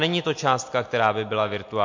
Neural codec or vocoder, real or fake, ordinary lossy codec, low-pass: none; real; MP3, 48 kbps; 7.2 kHz